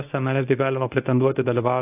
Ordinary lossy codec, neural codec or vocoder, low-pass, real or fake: AAC, 32 kbps; codec, 24 kHz, 0.9 kbps, WavTokenizer, medium speech release version 1; 3.6 kHz; fake